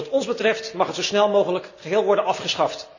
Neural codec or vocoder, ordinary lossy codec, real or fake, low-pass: none; none; real; 7.2 kHz